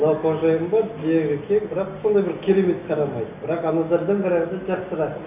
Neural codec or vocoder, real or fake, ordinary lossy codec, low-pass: none; real; none; 3.6 kHz